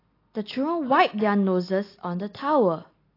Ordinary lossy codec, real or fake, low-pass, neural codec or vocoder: AAC, 32 kbps; real; 5.4 kHz; none